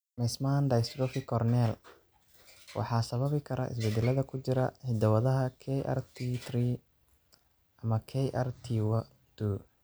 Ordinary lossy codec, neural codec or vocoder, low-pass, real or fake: none; none; none; real